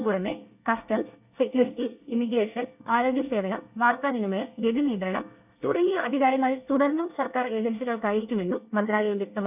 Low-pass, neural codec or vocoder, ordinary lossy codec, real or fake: 3.6 kHz; codec, 24 kHz, 1 kbps, SNAC; none; fake